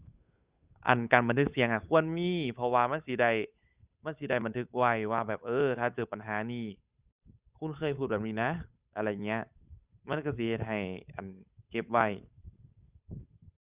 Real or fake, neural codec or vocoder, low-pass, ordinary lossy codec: fake; codec, 16 kHz, 8 kbps, FunCodec, trained on Chinese and English, 25 frames a second; 3.6 kHz; Opus, 64 kbps